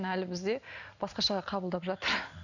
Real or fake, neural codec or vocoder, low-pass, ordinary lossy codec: real; none; 7.2 kHz; none